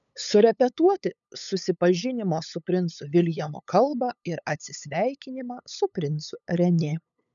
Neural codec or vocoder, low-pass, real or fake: codec, 16 kHz, 8 kbps, FunCodec, trained on LibriTTS, 25 frames a second; 7.2 kHz; fake